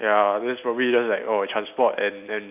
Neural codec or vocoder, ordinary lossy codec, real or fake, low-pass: none; none; real; 3.6 kHz